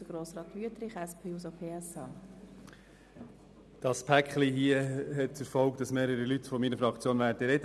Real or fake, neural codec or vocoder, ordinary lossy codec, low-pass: real; none; none; none